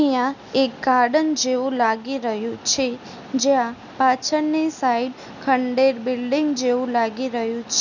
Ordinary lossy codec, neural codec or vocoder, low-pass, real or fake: none; none; 7.2 kHz; real